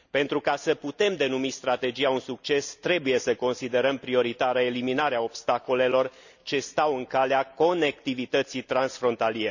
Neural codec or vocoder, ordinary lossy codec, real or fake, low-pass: none; none; real; 7.2 kHz